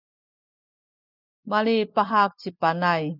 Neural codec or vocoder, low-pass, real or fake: none; 5.4 kHz; real